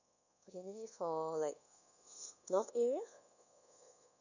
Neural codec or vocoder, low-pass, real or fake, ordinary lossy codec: codec, 24 kHz, 1.2 kbps, DualCodec; 7.2 kHz; fake; none